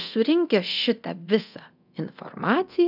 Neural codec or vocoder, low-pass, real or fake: codec, 24 kHz, 0.9 kbps, DualCodec; 5.4 kHz; fake